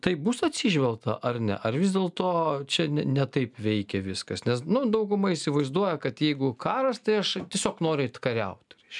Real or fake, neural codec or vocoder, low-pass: real; none; 10.8 kHz